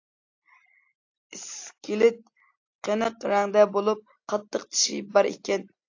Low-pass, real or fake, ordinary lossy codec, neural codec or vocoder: 7.2 kHz; real; AAC, 48 kbps; none